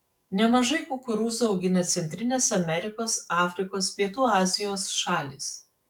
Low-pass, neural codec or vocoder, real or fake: 19.8 kHz; codec, 44.1 kHz, 7.8 kbps, DAC; fake